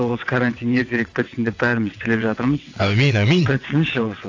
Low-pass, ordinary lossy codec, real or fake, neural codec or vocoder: 7.2 kHz; AAC, 48 kbps; fake; vocoder, 22.05 kHz, 80 mel bands, WaveNeXt